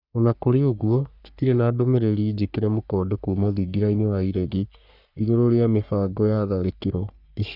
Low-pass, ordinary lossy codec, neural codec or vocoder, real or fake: 5.4 kHz; none; codec, 44.1 kHz, 3.4 kbps, Pupu-Codec; fake